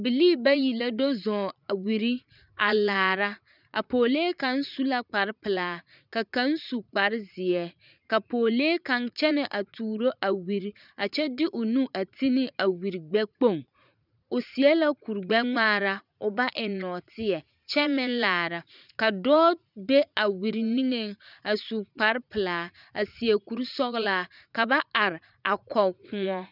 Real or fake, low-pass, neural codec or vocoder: fake; 5.4 kHz; vocoder, 44.1 kHz, 80 mel bands, Vocos